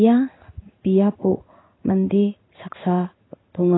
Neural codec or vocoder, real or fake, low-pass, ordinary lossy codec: codec, 16 kHz in and 24 kHz out, 1 kbps, XY-Tokenizer; fake; 7.2 kHz; AAC, 16 kbps